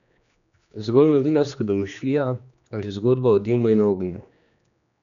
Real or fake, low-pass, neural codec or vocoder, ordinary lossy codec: fake; 7.2 kHz; codec, 16 kHz, 2 kbps, X-Codec, HuBERT features, trained on general audio; none